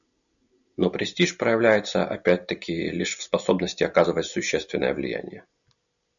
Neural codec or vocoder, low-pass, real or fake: none; 7.2 kHz; real